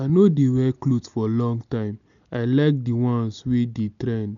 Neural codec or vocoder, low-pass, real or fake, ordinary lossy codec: none; 7.2 kHz; real; none